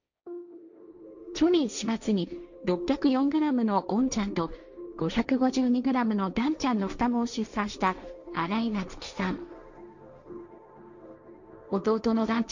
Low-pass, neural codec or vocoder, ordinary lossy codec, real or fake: 7.2 kHz; codec, 16 kHz, 1.1 kbps, Voila-Tokenizer; none; fake